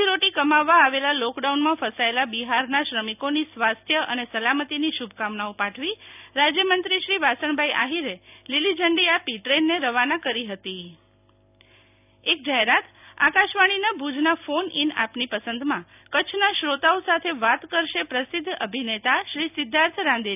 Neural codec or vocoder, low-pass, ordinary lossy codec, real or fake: none; 3.6 kHz; none; real